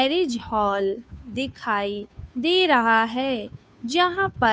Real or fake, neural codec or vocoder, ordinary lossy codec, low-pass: fake; codec, 16 kHz, 2 kbps, FunCodec, trained on Chinese and English, 25 frames a second; none; none